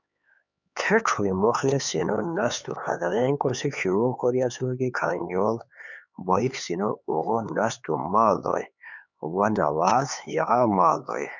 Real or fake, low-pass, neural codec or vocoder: fake; 7.2 kHz; codec, 16 kHz, 4 kbps, X-Codec, HuBERT features, trained on LibriSpeech